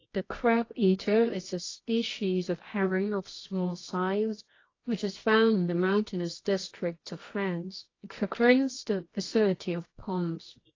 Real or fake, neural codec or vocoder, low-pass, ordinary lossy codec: fake; codec, 24 kHz, 0.9 kbps, WavTokenizer, medium music audio release; 7.2 kHz; AAC, 32 kbps